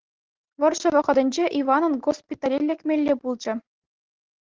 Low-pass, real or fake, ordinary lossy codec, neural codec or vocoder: 7.2 kHz; real; Opus, 16 kbps; none